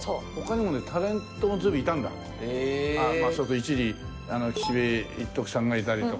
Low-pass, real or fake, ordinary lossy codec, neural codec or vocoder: none; real; none; none